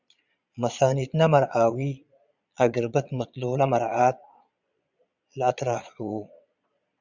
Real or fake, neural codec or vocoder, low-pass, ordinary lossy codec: fake; vocoder, 22.05 kHz, 80 mel bands, Vocos; 7.2 kHz; Opus, 64 kbps